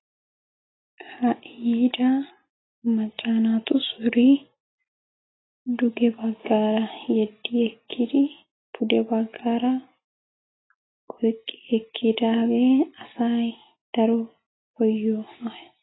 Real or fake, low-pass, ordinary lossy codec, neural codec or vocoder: real; 7.2 kHz; AAC, 16 kbps; none